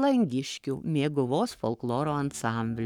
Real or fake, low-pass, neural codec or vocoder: fake; 19.8 kHz; codec, 44.1 kHz, 7.8 kbps, Pupu-Codec